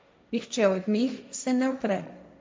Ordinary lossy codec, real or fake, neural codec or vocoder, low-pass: none; fake; codec, 16 kHz, 1.1 kbps, Voila-Tokenizer; none